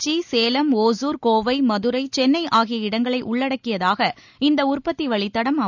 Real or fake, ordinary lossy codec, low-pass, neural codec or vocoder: real; none; 7.2 kHz; none